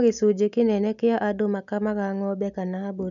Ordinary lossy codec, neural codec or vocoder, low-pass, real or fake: none; none; 7.2 kHz; real